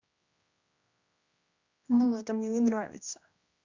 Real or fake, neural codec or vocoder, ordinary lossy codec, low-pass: fake; codec, 16 kHz, 1 kbps, X-Codec, HuBERT features, trained on general audio; Opus, 64 kbps; 7.2 kHz